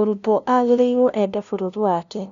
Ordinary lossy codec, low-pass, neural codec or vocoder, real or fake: none; 7.2 kHz; codec, 16 kHz, 0.5 kbps, FunCodec, trained on LibriTTS, 25 frames a second; fake